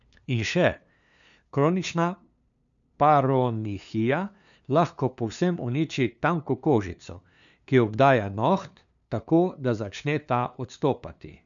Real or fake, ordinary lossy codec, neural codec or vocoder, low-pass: fake; none; codec, 16 kHz, 2 kbps, FunCodec, trained on LibriTTS, 25 frames a second; 7.2 kHz